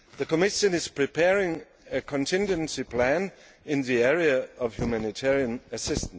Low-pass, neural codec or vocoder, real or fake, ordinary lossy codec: none; none; real; none